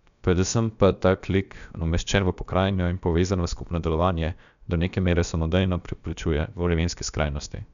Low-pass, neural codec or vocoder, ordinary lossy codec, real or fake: 7.2 kHz; codec, 16 kHz, about 1 kbps, DyCAST, with the encoder's durations; none; fake